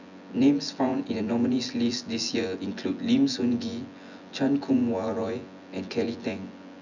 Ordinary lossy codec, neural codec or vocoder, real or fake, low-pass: none; vocoder, 24 kHz, 100 mel bands, Vocos; fake; 7.2 kHz